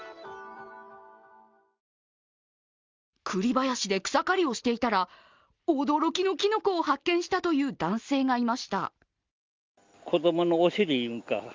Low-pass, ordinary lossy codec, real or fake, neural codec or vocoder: 7.2 kHz; Opus, 32 kbps; real; none